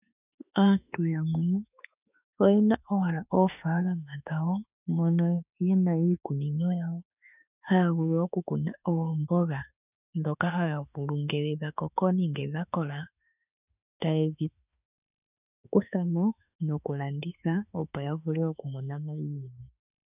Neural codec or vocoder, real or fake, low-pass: autoencoder, 48 kHz, 32 numbers a frame, DAC-VAE, trained on Japanese speech; fake; 3.6 kHz